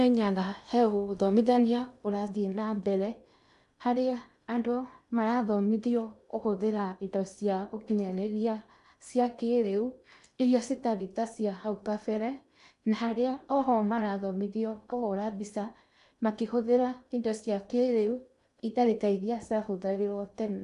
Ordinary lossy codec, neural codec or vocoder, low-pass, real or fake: none; codec, 16 kHz in and 24 kHz out, 0.8 kbps, FocalCodec, streaming, 65536 codes; 10.8 kHz; fake